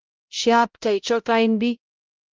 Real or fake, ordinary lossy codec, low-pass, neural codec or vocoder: fake; Opus, 32 kbps; 7.2 kHz; codec, 16 kHz, 0.5 kbps, X-Codec, HuBERT features, trained on balanced general audio